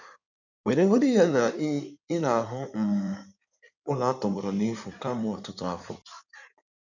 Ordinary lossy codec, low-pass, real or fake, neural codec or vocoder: none; 7.2 kHz; fake; codec, 16 kHz in and 24 kHz out, 2.2 kbps, FireRedTTS-2 codec